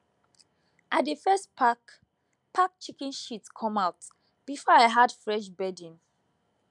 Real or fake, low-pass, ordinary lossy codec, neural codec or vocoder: real; 10.8 kHz; none; none